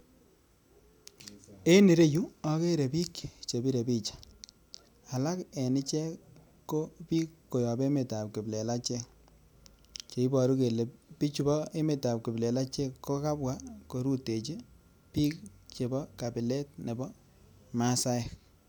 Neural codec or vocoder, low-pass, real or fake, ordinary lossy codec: none; none; real; none